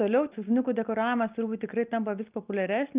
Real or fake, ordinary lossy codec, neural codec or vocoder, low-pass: real; Opus, 24 kbps; none; 3.6 kHz